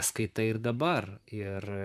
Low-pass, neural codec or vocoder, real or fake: 14.4 kHz; vocoder, 44.1 kHz, 128 mel bands, Pupu-Vocoder; fake